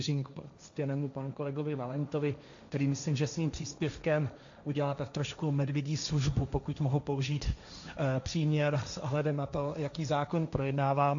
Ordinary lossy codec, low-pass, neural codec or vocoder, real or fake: AAC, 64 kbps; 7.2 kHz; codec, 16 kHz, 1.1 kbps, Voila-Tokenizer; fake